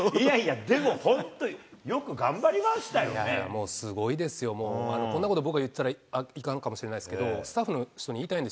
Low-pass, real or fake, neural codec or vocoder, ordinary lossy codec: none; real; none; none